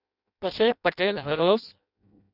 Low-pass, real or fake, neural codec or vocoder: 5.4 kHz; fake; codec, 16 kHz in and 24 kHz out, 0.6 kbps, FireRedTTS-2 codec